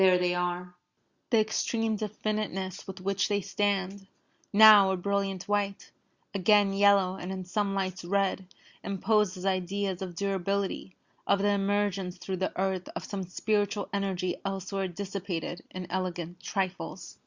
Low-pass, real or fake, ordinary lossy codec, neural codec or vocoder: 7.2 kHz; real; Opus, 64 kbps; none